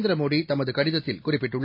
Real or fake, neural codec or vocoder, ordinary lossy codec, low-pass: real; none; MP3, 32 kbps; 5.4 kHz